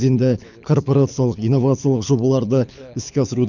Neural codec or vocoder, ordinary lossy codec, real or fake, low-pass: codec, 24 kHz, 6 kbps, HILCodec; none; fake; 7.2 kHz